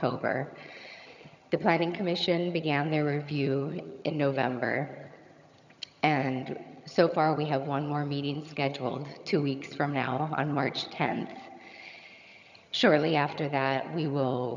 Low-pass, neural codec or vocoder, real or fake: 7.2 kHz; vocoder, 22.05 kHz, 80 mel bands, HiFi-GAN; fake